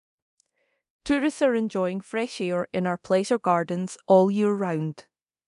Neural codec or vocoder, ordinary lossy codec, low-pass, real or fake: codec, 24 kHz, 0.9 kbps, DualCodec; none; 10.8 kHz; fake